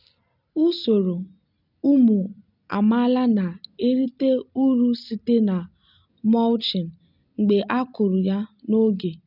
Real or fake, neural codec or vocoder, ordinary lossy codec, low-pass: real; none; none; 5.4 kHz